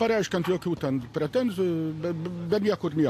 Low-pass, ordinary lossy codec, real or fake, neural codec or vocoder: 14.4 kHz; AAC, 64 kbps; real; none